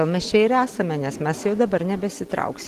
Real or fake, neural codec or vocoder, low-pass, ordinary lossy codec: real; none; 14.4 kHz; Opus, 16 kbps